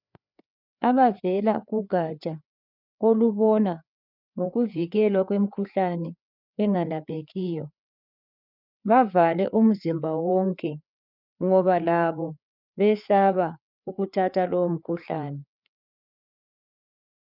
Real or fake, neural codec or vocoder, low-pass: fake; codec, 16 kHz, 4 kbps, FreqCodec, larger model; 5.4 kHz